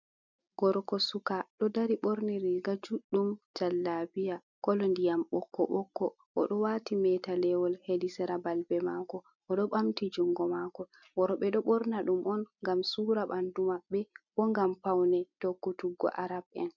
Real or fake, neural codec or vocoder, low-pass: real; none; 7.2 kHz